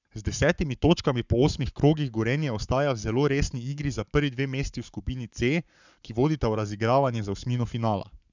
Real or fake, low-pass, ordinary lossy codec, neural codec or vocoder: fake; 7.2 kHz; none; codec, 44.1 kHz, 7.8 kbps, Pupu-Codec